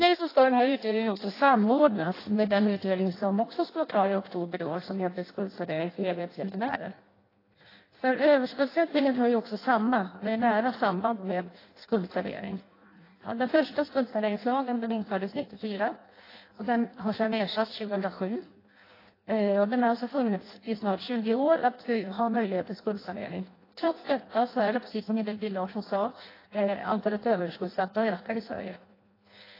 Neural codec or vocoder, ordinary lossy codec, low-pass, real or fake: codec, 16 kHz in and 24 kHz out, 0.6 kbps, FireRedTTS-2 codec; AAC, 24 kbps; 5.4 kHz; fake